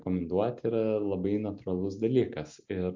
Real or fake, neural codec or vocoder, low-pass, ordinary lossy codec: real; none; 7.2 kHz; MP3, 48 kbps